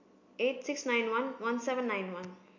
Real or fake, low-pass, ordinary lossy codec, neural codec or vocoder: real; 7.2 kHz; MP3, 64 kbps; none